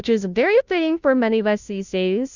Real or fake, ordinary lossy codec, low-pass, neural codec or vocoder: fake; Opus, 64 kbps; 7.2 kHz; codec, 16 kHz, 0.5 kbps, FunCodec, trained on Chinese and English, 25 frames a second